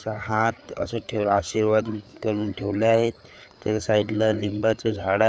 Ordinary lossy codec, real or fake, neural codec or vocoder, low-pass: none; fake; codec, 16 kHz, 4 kbps, FreqCodec, larger model; none